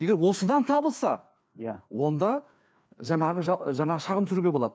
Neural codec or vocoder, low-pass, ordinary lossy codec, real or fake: codec, 16 kHz, 2 kbps, FreqCodec, larger model; none; none; fake